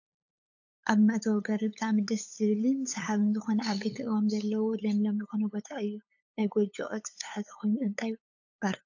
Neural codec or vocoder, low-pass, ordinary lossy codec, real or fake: codec, 16 kHz, 8 kbps, FunCodec, trained on LibriTTS, 25 frames a second; 7.2 kHz; AAC, 48 kbps; fake